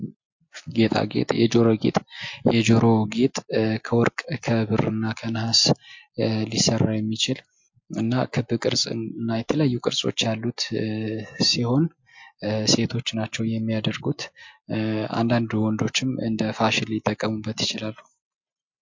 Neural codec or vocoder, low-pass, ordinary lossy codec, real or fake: none; 7.2 kHz; MP3, 48 kbps; real